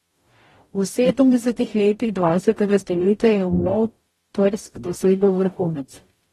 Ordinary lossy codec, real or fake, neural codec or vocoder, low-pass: AAC, 32 kbps; fake; codec, 44.1 kHz, 0.9 kbps, DAC; 19.8 kHz